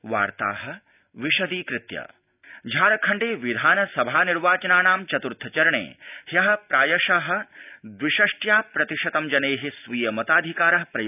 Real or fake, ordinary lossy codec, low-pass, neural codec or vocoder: real; none; 3.6 kHz; none